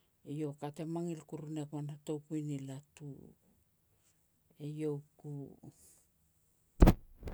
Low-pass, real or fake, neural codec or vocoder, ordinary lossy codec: none; real; none; none